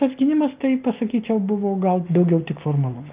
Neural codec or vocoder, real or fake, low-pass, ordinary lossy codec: none; real; 3.6 kHz; Opus, 24 kbps